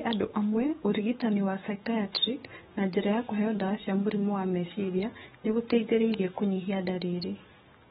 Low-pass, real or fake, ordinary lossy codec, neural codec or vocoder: 19.8 kHz; fake; AAC, 16 kbps; codec, 44.1 kHz, 7.8 kbps, DAC